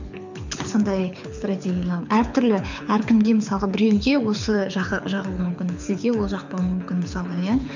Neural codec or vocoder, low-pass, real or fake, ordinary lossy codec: codec, 24 kHz, 6 kbps, HILCodec; 7.2 kHz; fake; none